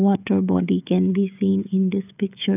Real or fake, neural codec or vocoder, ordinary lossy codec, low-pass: fake; codec, 16 kHz, 16 kbps, FreqCodec, smaller model; none; 3.6 kHz